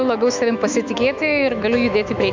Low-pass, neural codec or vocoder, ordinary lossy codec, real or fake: 7.2 kHz; autoencoder, 48 kHz, 128 numbers a frame, DAC-VAE, trained on Japanese speech; MP3, 64 kbps; fake